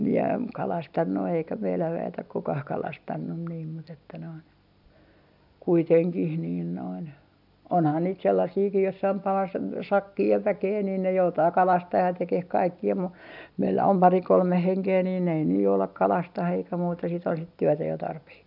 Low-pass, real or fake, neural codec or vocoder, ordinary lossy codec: 5.4 kHz; real; none; none